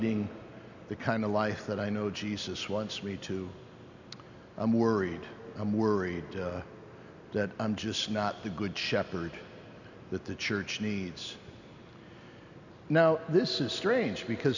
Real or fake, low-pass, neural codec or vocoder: real; 7.2 kHz; none